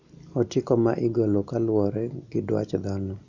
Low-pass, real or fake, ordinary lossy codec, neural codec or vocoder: 7.2 kHz; real; none; none